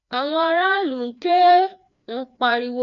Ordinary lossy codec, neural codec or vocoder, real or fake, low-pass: none; codec, 16 kHz, 2 kbps, FreqCodec, larger model; fake; 7.2 kHz